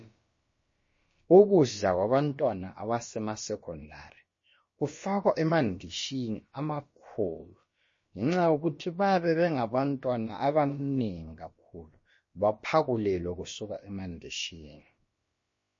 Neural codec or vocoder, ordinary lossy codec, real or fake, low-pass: codec, 16 kHz, about 1 kbps, DyCAST, with the encoder's durations; MP3, 32 kbps; fake; 7.2 kHz